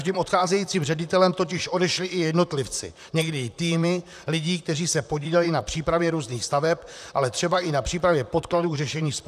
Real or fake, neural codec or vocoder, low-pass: fake; vocoder, 44.1 kHz, 128 mel bands, Pupu-Vocoder; 14.4 kHz